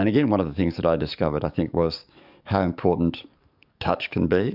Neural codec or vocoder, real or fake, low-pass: codec, 44.1 kHz, 7.8 kbps, DAC; fake; 5.4 kHz